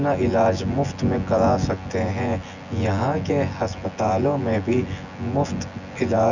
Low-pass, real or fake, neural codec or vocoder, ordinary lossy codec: 7.2 kHz; fake; vocoder, 24 kHz, 100 mel bands, Vocos; none